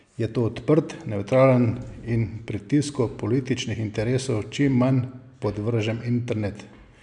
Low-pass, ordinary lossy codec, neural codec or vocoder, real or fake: 9.9 kHz; none; none; real